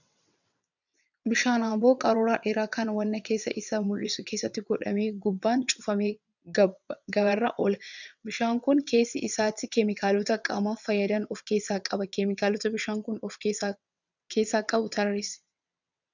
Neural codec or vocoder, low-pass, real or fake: vocoder, 22.05 kHz, 80 mel bands, WaveNeXt; 7.2 kHz; fake